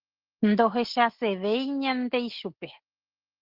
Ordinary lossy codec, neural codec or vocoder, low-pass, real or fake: Opus, 16 kbps; none; 5.4 kHz; real